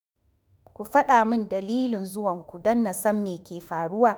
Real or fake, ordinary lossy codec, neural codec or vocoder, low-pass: fake; none; autoencoder, 48 kHz, 32 numbers a frame, DAC-VAE, trained on Japanese speech; none